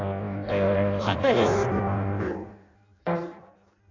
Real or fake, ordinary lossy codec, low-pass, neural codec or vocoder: fake; AAC, 48 kbps; 7.2 kHz; codec, 16 kHz in and 24 kHz out, 0.6 kbps, FireRedTTS-2 codec